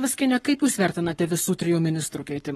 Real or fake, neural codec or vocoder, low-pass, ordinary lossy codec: fake; codec, 44.1 kHz, 7.8 kbps, Pupu-Codec; 19.8 kHz; AAC, 32 kbps